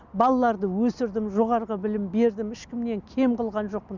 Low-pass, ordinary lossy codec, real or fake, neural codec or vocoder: 7.2 kHz; none; real; none